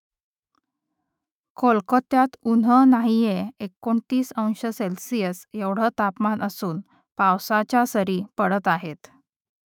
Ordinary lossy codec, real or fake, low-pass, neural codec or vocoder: none; fake; 19.8 kHz; autoencoder, 48 kHz, 128 numbers a frame, DAC-VAE, trained on Japanese speech